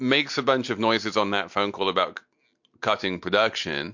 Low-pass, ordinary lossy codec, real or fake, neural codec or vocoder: 7.2 kHz; MP3, 48 kbps; real; none